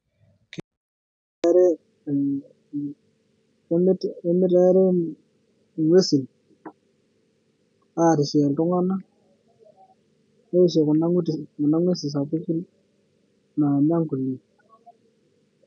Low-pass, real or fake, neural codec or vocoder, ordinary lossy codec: 9.9 kHz; real; none; none